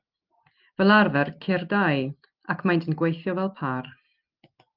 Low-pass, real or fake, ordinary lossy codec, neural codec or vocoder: 5.4 kHz; real; Opus, 32 kbps; none